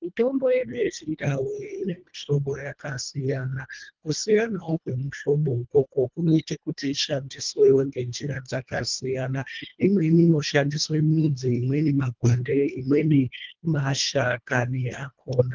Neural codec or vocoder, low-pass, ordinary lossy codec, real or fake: codec, 24 kHz, 1.5 kbps, HILCodec; 7.2 kHz; Opus, 24 kbps; fake